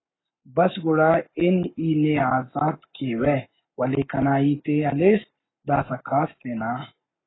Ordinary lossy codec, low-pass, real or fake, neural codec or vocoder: AAC, 16 kbps; 7.2 kHz; fake; codec, 44.1 kHz, 7.8 kbps, Pupu-Codec